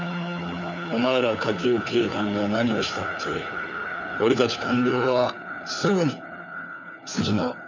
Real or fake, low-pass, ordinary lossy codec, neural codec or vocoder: fake; 7.2 kHz; none; codec, 16 kHz, 4 kbps, FunCodec, trained on LibriTTS, 50 frames a second